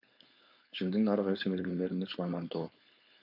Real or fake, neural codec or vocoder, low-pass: fake; codec, 16 kHz, 4.8 kbps, FACodec; 5.4 kHz